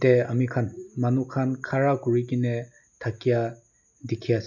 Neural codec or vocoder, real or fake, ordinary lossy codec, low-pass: none; real; none; 7.2 kHz